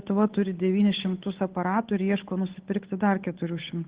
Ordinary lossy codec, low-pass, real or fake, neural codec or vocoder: Opus, 16 kbps; 3.6 kHz; fake; codec, 16 kHz, 8 kbps, FunCodec, trained on Chinese and English, 25 frames a second